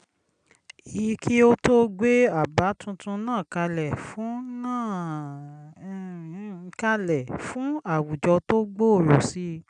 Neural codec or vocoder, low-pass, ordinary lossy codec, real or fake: none; 9.9 kHz; none; real